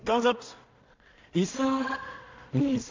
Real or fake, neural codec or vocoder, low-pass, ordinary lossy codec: fake; codec, 16 kHz in and 24 kHz out, 0.4 kbps, LongCat-Audio-Codec, two codebook decoder; 7.2 kHz; MP3, 64 kbps